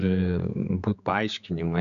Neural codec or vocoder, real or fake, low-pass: codec, 16 kHz, 4 kbps, X-Codec, HuBERT features, trained on general audio; fake; 7.2 kHz